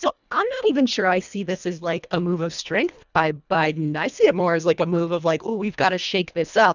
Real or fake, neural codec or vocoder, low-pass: fake; codec, 24 kHz, 1.5 kbps, HILCodec; 7.2 kHz